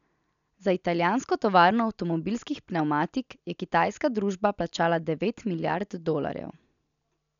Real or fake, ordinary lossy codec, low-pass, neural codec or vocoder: real; none; 7.2 kHz; none